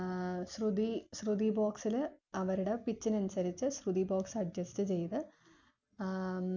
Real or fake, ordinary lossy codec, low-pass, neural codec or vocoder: real; none; 7.2 kHz; none